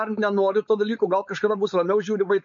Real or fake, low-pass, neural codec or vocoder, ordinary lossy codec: fake; 7.2 kHz; codec, 16 kHz, 4.8 kbps, FACodec; MP3, 48 kbps